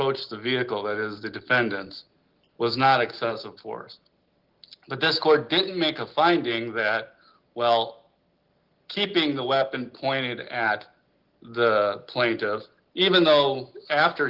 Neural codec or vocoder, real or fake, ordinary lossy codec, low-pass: none; real; Opus, 16 kbps; 5.4 kHz